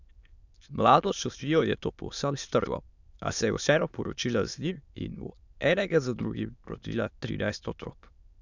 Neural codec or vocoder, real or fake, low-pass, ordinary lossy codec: autoencoder, 22.05 kHz, a latent of 192 numbers a frame, VITS, trained on many speakers; fake; 7.2 kHz; none